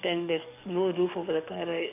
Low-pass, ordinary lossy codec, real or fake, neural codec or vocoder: 3.6 kHz; none; fake; codec, 44.1 kHz, 7.8 kbps, DAC